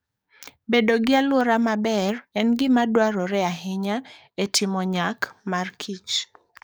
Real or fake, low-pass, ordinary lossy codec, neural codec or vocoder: fake; none; none; codec, 44.1 kHz, 7.8 kbps, DAC